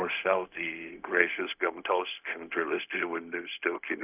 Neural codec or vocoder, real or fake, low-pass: codec, 16 kHz, 0.4 kbps, LongCat-Audio-Codec; fake; 3.6 kHz